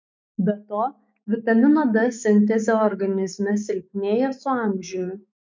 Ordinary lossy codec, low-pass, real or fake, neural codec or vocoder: MP3, 48 kbps; 7.2 kHz; fake; autoencoder, 48 kHz, 128 numbers a frame, DAC-VAE, trained on Japanese speech